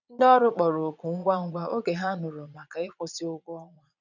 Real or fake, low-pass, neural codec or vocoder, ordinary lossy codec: fake; 7.2 kHz; vocoder, 22.05 kHz, 80 mel bands, Vocos; none